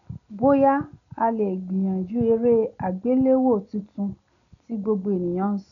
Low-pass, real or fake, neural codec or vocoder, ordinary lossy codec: 7.2 kHz; real; none; none